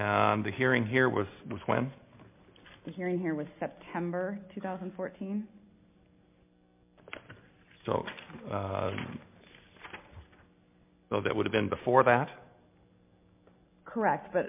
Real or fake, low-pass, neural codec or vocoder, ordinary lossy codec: real; 3.6 kHz; none; MP3, 32 kbps